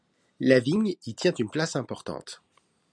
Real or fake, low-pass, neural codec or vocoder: real; 9.9 kHz; none